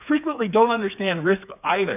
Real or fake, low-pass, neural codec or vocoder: fake; 3.6 kHz; codec, 16 kHz, 4 kbps, FreqCodec, smaller model